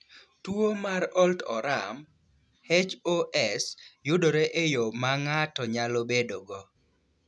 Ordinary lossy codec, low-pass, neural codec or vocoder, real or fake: none; none; none; real